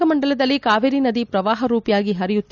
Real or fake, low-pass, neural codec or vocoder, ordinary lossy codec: real; 7.2 kHz; none; none